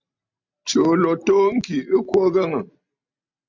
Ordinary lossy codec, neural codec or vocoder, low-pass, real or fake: MP3, 64 kbps; none; 7.2 kHz; real